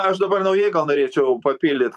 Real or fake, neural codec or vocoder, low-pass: fake; vocoder, 44.1 kHz, 128 mel bands every 512 samples, BigVGAN v2; 14.4 kHz